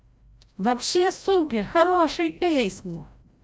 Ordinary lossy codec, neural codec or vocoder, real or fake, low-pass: none; codec, 16 kHz, 0.5 kbps, FreqCodec, larger model; fake; none